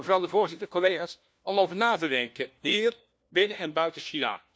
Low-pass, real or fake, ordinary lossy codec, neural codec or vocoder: none; fake; none; codec, 16 kHz, 1 kbps, FunCodec, trained on LibriTTS, 50 frames a second